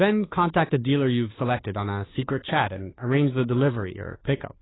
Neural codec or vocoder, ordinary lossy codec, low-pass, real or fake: codec, 16 kHz, 2 kbps, FunCodec, trained on Chinese and English, 25 frames a second; AAC, 16 kbps; 7.2 kHz; fake